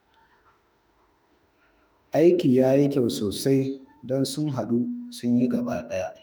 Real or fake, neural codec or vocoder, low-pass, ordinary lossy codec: fake; autoencoder, 48 kHz, 32 numbers a frame, DAC-VAE, trained on Japanese speech; none; none